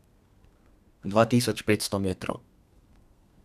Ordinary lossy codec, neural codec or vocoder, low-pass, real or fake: none; codec, 32 kHz, 1.9 kbps, SNAC; 14.4 kHz; fake